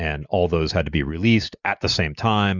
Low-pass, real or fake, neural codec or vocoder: 7.2 kHz; real; none